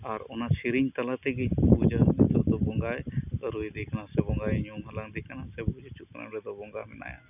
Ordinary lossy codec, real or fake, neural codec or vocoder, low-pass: none; real; none; 3.6 kHz